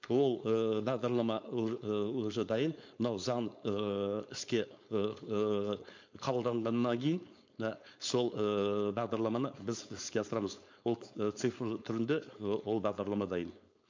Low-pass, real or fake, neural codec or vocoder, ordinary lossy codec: 7.2 kHz; fake; codec, 16 kHz, 4.8 kbps, FACodec; MP3, 48 kbps